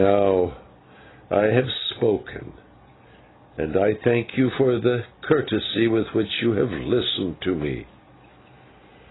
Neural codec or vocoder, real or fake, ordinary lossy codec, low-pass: none; real; AAC, 16 kbps; 7.2 kHz